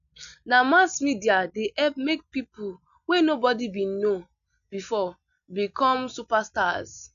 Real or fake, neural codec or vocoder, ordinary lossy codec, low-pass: real; none; none; 7.2 kHz